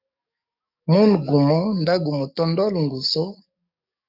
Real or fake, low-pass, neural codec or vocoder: fake; 5.4 kHz; codec, 44.1 kHz, 7.8 kbps, DAC